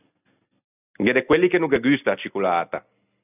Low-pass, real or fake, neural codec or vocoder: 3.6 kHz; real; none